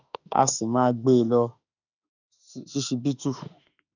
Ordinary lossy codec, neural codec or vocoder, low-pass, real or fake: none; autoencoder, 48 kHz, 32 numbers a frame, DAC-VAE, trained on Japanese speech; 7.2 kHz; fake